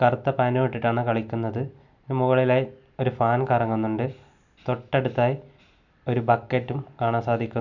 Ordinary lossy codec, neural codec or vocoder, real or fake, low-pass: none; none; real; 7.2 kHz